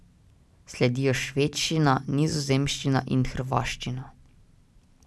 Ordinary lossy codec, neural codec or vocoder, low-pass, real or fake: none; none; none; real